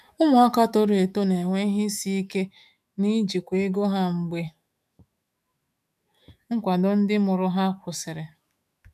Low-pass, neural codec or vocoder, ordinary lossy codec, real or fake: 14.4 kHz; autoencoder, 48 kHz, 128 numbers a frame, DAC-VAE, trained on Japanese speech; none; fake